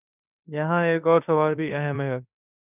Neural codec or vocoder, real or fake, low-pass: codec, 16 kHz in and 24 kHz out, 0.9 kbps, LongCat-Audio-Codec, fine tuned four codebook decoder; fake; 3.6 kHz